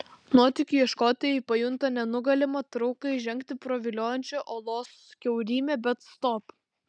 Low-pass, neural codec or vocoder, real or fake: 9.9 kHz; none; real